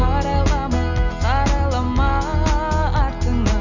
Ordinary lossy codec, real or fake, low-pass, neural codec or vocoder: none; real; 7.2 kHz; none